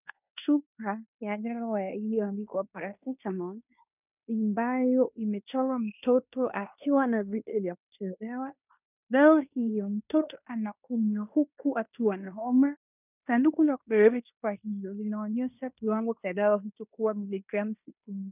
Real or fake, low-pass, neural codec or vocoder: fake; 3.6 kHz; codec, 16 kHz in and 24 kHz out, 0.9 kbps, LongCat-Audio-Codec, fine tuned four codebook decoder